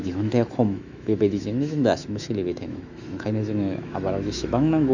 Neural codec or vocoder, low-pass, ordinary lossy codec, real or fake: autoencoder, 48 kHz, 128 numbers a frame, DAC-VAE, trained on Japanese speech; 7.2 kHz; none; fake